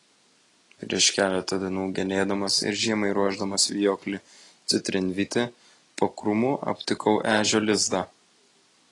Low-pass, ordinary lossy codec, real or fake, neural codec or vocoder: 10.8 kHz; AAC, 32 kbps; real; none